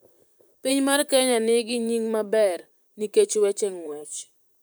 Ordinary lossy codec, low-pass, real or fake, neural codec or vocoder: none; none; fake; vocoder, 44.1 kHz, 128 mel bands, Pupu-Vocoder